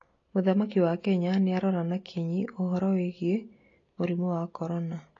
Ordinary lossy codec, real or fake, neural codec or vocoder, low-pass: AAC, 32 kbps; real; none; 7.2 kHz